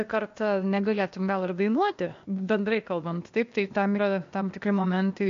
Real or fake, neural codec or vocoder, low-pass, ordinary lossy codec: fake; codec, 16 kHz, 0.8 kbps, ZipCodec; 7.2 kHz; MP3, 48 kbps